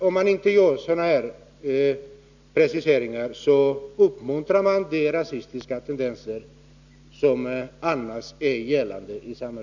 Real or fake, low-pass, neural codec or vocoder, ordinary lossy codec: real; 7.2 kHz; none; none